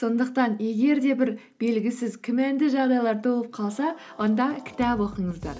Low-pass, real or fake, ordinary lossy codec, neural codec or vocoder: none; real; none; none